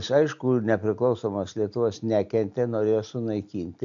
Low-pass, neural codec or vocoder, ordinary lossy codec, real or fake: 7.2 kHz; none; AAC, 64 kbps; real